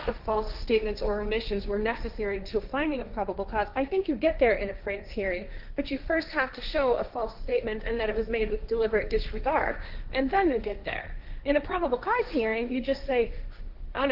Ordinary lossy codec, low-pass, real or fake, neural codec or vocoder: Opus, 24 kbps; 5.4 kHz; fake; codec, 16 kHz, 1.1 kbps, Voila-Tokenizer